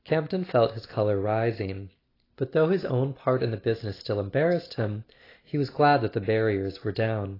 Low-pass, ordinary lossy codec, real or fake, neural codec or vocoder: 5.4 kHz; AAC, 24 kbps; real; none